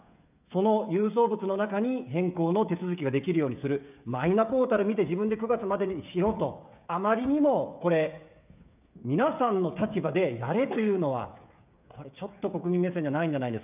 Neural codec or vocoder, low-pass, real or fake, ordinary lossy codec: codec, 16 kHz, 16 kbps, FreqCodec, smaller model; 3.6 kHz; fake; none